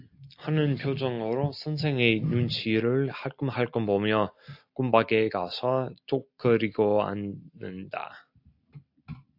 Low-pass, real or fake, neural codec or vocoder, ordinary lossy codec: 5.4 kHz; real; none; AAC, 48 kbps